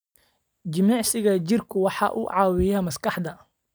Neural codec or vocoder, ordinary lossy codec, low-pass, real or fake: none; none; none; real